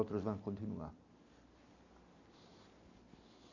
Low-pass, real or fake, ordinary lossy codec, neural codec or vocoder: 7.2 kHz; real; none; none